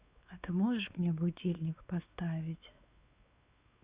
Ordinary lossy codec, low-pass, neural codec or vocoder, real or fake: Opus, 64 kbps; 3.6 kHz; codec, 16 kHz, 4 kbps, X-Codec, WavLM features, trained on Multilingual LibriSpeech; fake